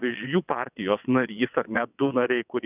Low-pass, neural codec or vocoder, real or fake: 3.6 kHz; vocoder, 22.05 kHz, 80 mel bands, WaveNeXt; fake